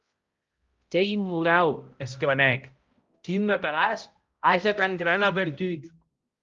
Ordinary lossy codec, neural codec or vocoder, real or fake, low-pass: Opus, 32 kbps; codec, 16 kHz, 0.5 kbps, X-Codec, HuBERT features, trained on balanced general audio; fake; 7.2 kHz